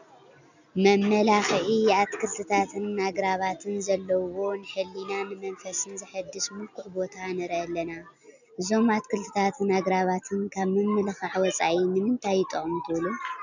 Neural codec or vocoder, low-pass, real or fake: none; 7.2 kHz; real